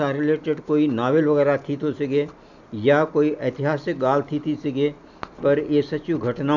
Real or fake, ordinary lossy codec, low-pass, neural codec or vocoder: real; none; 7.2 kHz; none